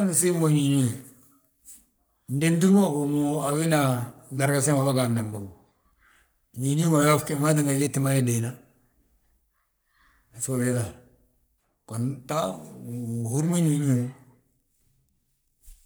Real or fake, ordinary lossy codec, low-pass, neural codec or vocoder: fake; none; none; codec, 44.1 kHz, 3.4 kbps, Pupu-Codec